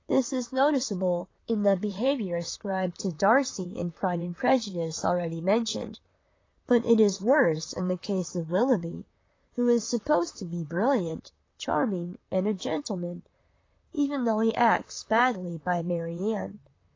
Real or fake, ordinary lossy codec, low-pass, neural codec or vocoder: fake; AAC, 32 kbps; 7.2 kHz; vocoder, 44.1 kHz, 128 mel bands, Pupu-Vocoder